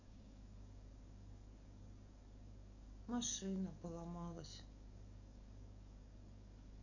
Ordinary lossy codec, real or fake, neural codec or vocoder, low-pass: none; fake; codec, 44.1 kHz, 7.8 kbps, DAC; 7.2 kHz